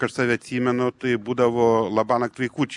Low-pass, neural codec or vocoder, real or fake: 10.8 kHz; none; real